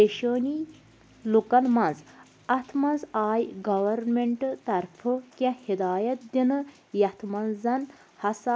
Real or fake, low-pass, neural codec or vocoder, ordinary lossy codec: real; none; none; none